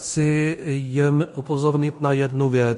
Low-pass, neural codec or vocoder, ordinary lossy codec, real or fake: 10.8 kHz; codec, 16 kHz in and 24 kHz out, 0.9 kbps, LongCat-Audio-Codec, fine tuned four codebook decoder; MP3, 48 kbps; fake